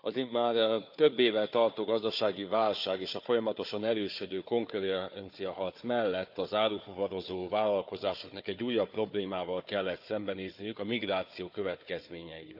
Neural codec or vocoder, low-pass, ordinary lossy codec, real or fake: codec, 16 kHz, 4 kbps, FunCodec, trained on Chinese and English, 50 frames a second; 5.4 kHz; none; fake